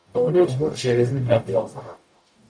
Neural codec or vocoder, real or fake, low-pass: codec, 44.1 kHz, 0.9 kbps, DAC; fake; 9.9 kHz